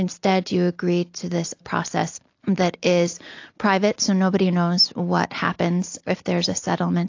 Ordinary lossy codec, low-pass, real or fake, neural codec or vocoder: AAC, 48 kbps; 7.2 kHz; real; none